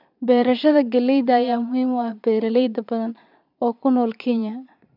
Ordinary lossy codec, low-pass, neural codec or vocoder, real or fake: none; 5.4 kHz; vocoder, 22.05 kHz, 80 mel bands, Vocos; fake